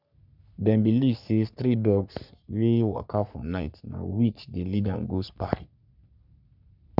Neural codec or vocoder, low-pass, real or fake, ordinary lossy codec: codec, 44.1 kHz, 3.4 kbps, Pupu-Codec; 5.4 kHz; fake; none